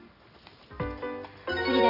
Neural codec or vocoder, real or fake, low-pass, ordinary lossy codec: none; real; 5.4 kHz; none